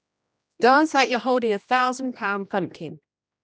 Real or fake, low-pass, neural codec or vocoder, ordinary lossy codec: fake; none; codec, 16 kHz, 1 kbps, X-Codec, HuBERT features, trained on general audio; none